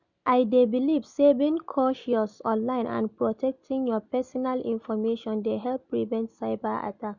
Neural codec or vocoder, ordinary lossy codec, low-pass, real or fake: none; none; 7.2 kHz; real